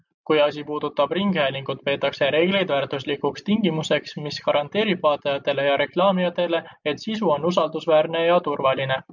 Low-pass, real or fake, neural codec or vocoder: 7.2 kHz; real; none